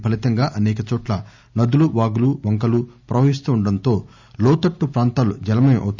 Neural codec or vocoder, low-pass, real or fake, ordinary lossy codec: none; 7.2 kHz; real; none